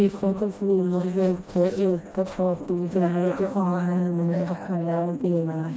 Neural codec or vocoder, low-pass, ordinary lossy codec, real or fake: codec, 16 kHz, 1 kbps, FreqCodec, smaller model; none; none; fake